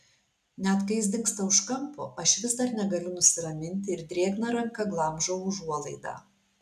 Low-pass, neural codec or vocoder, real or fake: 14.4 kHz; none; real